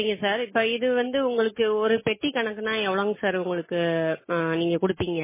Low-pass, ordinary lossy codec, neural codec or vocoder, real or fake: 3.6 kHz; MP3, 16 kbps; none; real